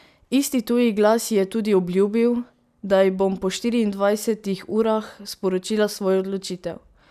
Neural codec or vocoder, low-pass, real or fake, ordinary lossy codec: none; 14.4 kHz; real; none